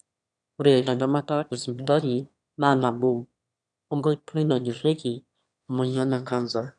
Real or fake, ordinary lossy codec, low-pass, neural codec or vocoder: fake; none; 9.9 kHz; autoencoder, 22.05 kHz, a latent of 192 numbers a frame, VITS, trained on one speaker